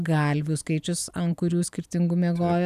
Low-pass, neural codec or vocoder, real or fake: 14.4 kHz; none; real